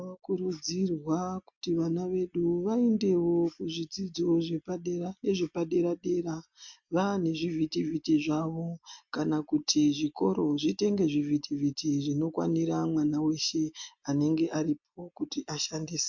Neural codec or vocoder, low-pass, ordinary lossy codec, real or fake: none; 7.2 kHz; MP3, 48 kbps; real